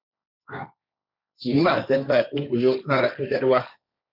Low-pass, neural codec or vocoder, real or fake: 5.4 kHz; codec, 16 kHz, 1.1 kbps, Voila-Tokenizer; fake